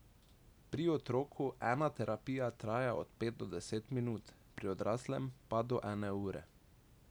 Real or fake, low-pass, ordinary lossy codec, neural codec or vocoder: real; none; none; none